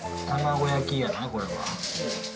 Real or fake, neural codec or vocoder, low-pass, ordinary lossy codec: real; none; none; none